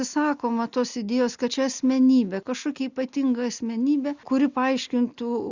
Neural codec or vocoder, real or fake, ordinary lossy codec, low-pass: none; real; Opus, 64 kbps; 7.2 kHz